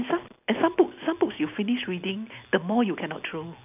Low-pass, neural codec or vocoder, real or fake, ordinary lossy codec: 3.6 kHz; none; real; none